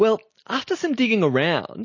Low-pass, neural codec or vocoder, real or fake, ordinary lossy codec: 7.2 kHz; none; real; MP3, 32 kbps